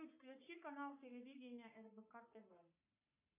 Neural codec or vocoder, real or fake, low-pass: codec, 44.1 kHz, 3.4 kbps, Pupu-Codec; fake; 3.6 kHz